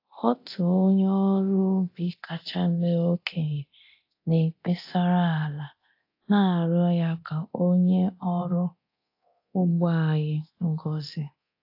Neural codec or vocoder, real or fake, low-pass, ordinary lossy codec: codec, 24 kHz, 0.9 kbps, DualCodec; fake; 5.4 kHz; AAC, 32 kbps